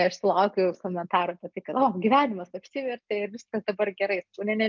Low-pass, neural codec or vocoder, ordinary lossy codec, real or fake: 7.2 kHz; none; MP3, 64 kbps; real